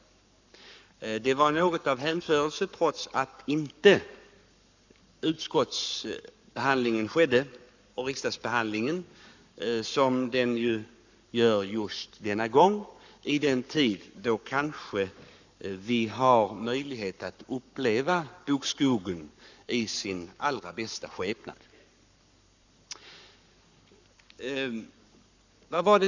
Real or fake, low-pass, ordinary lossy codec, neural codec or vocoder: fake; 7.2 kHz; none; codec, 44.1 kHz, 7.8 kbps, Pupu-Codec